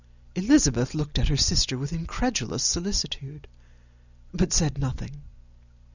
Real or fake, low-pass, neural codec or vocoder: real; 7.2 kHz; none